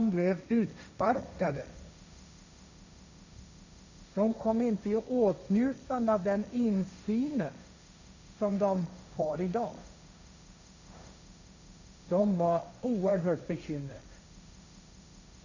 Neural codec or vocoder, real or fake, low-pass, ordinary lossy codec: codec, 16 kHz, 1.1 kbps, Voila-Tokenizer; fake; 7.2 kHz; none